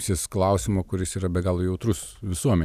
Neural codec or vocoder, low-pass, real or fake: none; 14.4 kHz; real